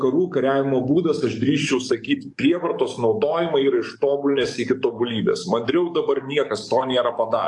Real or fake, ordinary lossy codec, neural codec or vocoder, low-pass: fake; AAC, 64 kbps; codec, 44.1 kHz, 7.8 kbps, DAC; 10.8 kHz